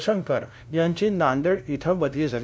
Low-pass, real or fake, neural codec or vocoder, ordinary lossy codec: none; fake; codec, 16 kHz, 0.5 kbps, FunCodec, trained on LibriTTS, 25 frames a second; none